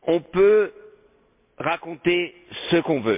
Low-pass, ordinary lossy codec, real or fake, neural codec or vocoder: 3.6 kHz; MP3, 32 kbps; real; none